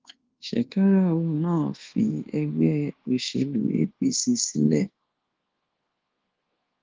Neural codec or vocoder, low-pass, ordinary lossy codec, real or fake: codec, 24 kHz, 1.2 kbps, DualCodec; 7.2 kHz; Opus, 16 kbps; fake